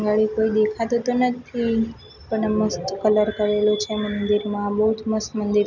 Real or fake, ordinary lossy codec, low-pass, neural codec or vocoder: real; none; 7.2 kHz; none